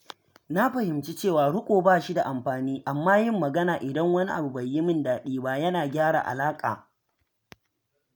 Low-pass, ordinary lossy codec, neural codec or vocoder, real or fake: none; none; none; real